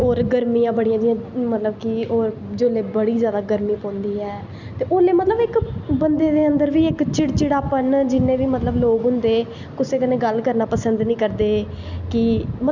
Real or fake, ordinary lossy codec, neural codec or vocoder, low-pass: real; none; none; 7.2 kHz